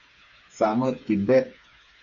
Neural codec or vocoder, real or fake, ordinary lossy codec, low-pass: codec, 16 kHz, 4 kbps, FreqCodec, smaller model; fake; MP3, 48 kbps; 7.2 kHz